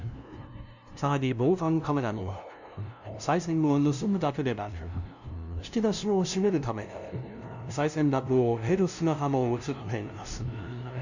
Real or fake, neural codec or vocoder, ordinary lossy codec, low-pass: fake; codec, 16 kHz, 0.5 kbps, FunCodec, trained on LibriTTS, 25 frames a second; none; 7.2 kHz